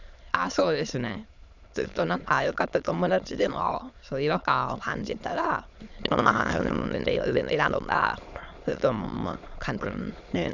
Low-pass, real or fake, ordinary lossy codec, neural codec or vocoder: 7.2 kHz; fake; none; autoencoder, 22.05 kHz, a latent of 192 numbers a frame, VITS, trained on many speakers